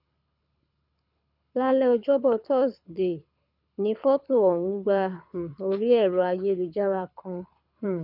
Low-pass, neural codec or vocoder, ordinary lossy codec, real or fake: 5.4 kHz; codec, 24 kHz, 6 kbps, HILCodec; none; fake